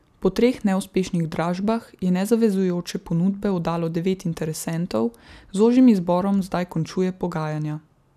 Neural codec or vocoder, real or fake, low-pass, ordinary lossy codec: none; real; 14.4 kHz; none